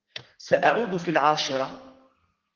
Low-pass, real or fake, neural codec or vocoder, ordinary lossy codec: 7.2 kHz; fake; codec, 32 kHz, 1.9 kbps, SNAC; Opus, 16 kbps